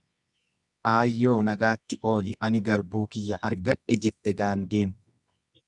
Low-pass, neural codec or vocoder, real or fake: 10.8 kHz; codec, 24 kHz, 0.9 kbps, WavTokenizer, medium music audio release; fake